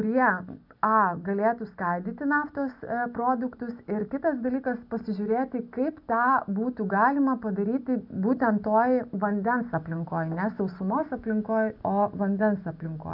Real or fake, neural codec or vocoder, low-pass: real; none; 5.4 kHz